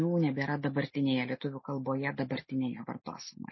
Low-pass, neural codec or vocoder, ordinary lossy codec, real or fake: 7.2 kHz; none; MP3, 24 kbps; real